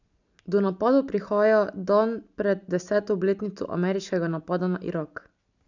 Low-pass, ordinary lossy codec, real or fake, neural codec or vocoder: 7.2 kHz; none; real; none